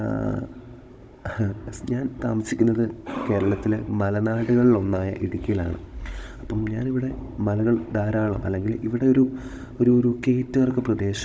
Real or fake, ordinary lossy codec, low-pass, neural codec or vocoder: fake; none; none; codec, 16 kHz, 16 kbps, FunCodec, trained on Chinese and English, 50 frames a second